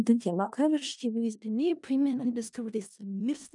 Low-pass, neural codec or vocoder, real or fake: 10.8 kHz; codec, 16 kHz in and 24 kHz out, 0.4 kbps, LongCat-Audio-Codec, four codebook decoder; fake